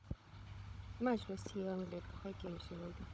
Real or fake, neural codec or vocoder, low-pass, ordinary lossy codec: fake; codec, 16 kHz, 16 kbps, FunCodec, trained on Chinese and English, 50 frames a second; none; none